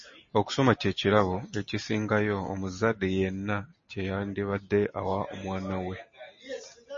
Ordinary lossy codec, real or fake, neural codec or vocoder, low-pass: MP3, 32 kbps; real; none; 7.2 kHz